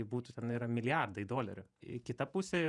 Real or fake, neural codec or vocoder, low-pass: fake; vocoder, 48 kHz, 128 mel bands, Vocos; 10.8 kHz